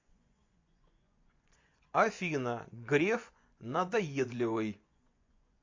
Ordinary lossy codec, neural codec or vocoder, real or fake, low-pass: MP3, 48 kbps; vocoder, 24 kHz, 100 mel bands, Vocos; fake; 7.2 kHz